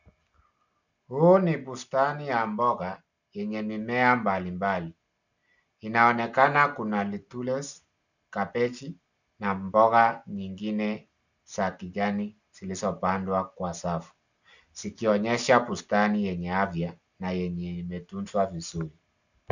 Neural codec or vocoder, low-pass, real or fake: none; 7.2 kHz; real